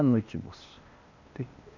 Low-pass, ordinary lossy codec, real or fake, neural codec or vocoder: 7.2 kHz; none; fake; codec, 16 kHz, 0.8 kbps, ZipCodec